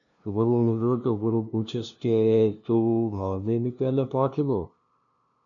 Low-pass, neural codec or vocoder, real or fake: 7.2 kHz; codec, 16 kHz, 0.5 kbps, FunCodec, trained on LibriTTS, 25 frames a second; fake